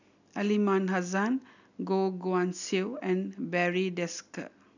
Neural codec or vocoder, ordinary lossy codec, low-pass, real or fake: none; none; 7.2 kHz; real